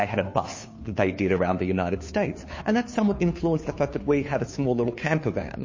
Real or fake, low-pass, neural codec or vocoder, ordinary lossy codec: fake; 7.2 kHz; codec, 16 kHz, 2 kbps, FunCodec, trained on Chinese and English, 25 frames a second; MP3, 32 kbps